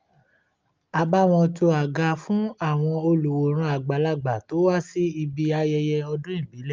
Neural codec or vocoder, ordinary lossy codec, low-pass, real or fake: none; Opus, 24 kbps; 7.2 kHz; real